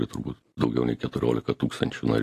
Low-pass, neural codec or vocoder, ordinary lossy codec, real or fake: 14.4 kHz; none; AAC, 48 kbps; real